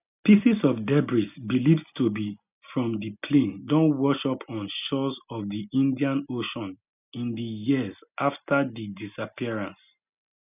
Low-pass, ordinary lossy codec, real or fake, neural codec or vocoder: 3.6 kHz; none; real; none